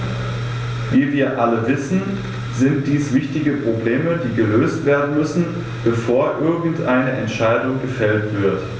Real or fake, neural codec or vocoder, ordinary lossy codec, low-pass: real; none; none; none